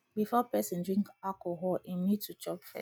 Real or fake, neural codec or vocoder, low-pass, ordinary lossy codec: real; none; none; none